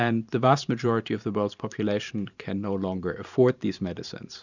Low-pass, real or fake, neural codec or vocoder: 7.2 kHz; fake; vocoder, 44.1 kHz, 128 mel bands every 512 samples, BigVGAN v2